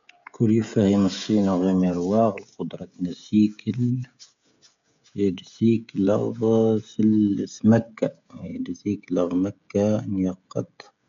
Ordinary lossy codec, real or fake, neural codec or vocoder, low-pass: MP3, 64 kbps; fake; codec, 16 kHz, 16 kbps, FreqCodec, smaller model; 7.2 kHz